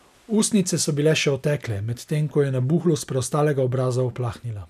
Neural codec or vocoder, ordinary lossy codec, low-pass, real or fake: none; none; 14.4 kHz; real